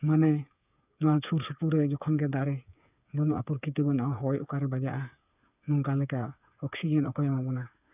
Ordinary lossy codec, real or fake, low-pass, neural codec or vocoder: none; fake; 3.6 kHz; codec, 16 kHz, 8 kbps, FreqCodec, smaller model